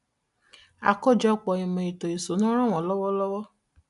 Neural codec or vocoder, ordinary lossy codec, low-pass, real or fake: none; none; 10.8 kHz; real